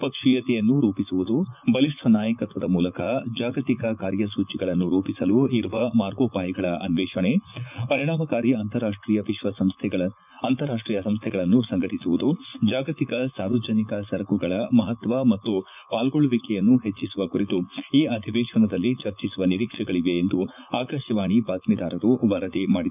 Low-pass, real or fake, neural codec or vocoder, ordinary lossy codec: 3.6 kHz; fake; vocoder, 44.1 kHz, 80 mel bands, Vocos; none